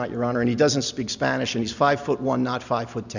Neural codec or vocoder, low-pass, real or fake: none; 7.2 kHz; real